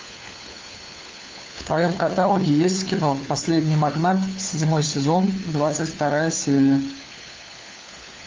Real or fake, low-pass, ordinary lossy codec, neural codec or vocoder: fake; 7.2 kHz; Opus, 24 kbps; codec, 16 kHz, 4 kbps, FunCodec, trained on LibriTTS, 50 frames a second